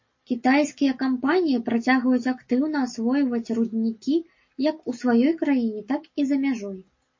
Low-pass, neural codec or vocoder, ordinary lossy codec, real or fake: 7.2 kHz; none; MP3, 32 kbps; real